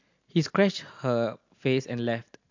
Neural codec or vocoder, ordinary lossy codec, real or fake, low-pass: none; AAC, 48 kbps; real; 7.2 kHz